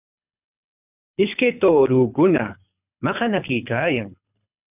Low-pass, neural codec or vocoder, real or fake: 3.6 kHz; codec, 24 kHz, 6 kbps, HILCodec; fake